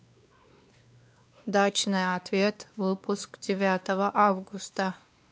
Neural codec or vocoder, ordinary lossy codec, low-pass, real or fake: codec, 16 kHz, 2 kbps, X-Codec, WavLM features, trained on Multilingual LibriSpeech; none; none; fake